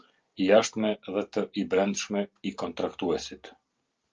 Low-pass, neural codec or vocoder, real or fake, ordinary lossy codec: 7.2 kHz; none; real; Opus, 24 kbps